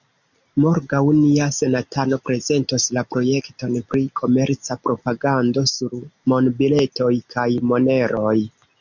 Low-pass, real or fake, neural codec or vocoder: 7.2 kHz; real; none